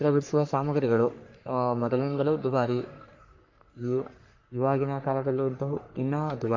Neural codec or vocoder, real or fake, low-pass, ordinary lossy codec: codec, 44.1 kHz, 3.4 kbps, Pupu-Codec; fake; 7.2 kHz; MP3, 48 kbps